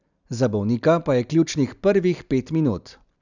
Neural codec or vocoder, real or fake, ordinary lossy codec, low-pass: none; real; none; 7.2 kHz